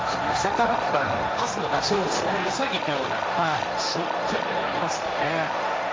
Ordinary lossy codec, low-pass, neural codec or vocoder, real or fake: none; none; codec, 16 kHz, 1.1 kbps, Voila-Tokenizer; fake